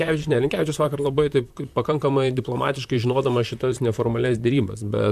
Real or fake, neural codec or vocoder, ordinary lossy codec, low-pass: fake; vocoder, 44.1 kHz, 128 mel bands, Pupu-Vocoder; MP3, 96 kbps; 14.4 kHz